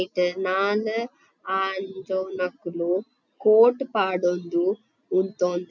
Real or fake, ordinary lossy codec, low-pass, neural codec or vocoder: real; none; 7.2 kHz; none